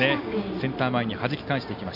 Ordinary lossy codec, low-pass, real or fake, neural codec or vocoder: none; 5.4 kHz; real; none